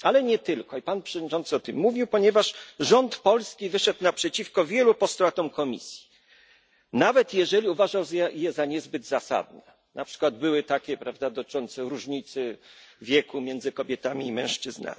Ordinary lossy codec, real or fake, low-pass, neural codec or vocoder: none; real; none; none